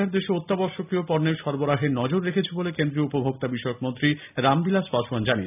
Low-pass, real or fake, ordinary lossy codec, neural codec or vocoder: 3.6 kHz; real; none; none